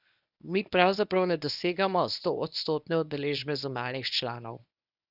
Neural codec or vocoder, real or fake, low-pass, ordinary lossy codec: codec, 24 kHz, 0.9 kbps, WavTokenizer, medium speech release version 1; fake; 5.4 kHz; none